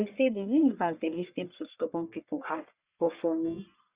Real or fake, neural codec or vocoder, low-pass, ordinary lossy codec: fake; codec, 44.1 kHz, 1.7 kbps, Pupu-Codec; 3.6 kHz; Opus, 64 kbps